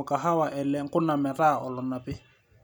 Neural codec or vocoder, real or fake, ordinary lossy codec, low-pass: none; real; none; 19.8 kHz